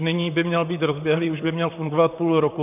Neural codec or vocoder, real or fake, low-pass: vocoder, 44.1 kHz, 128 mel bands, Pupu-Vocoder; fake; 3.6 kHz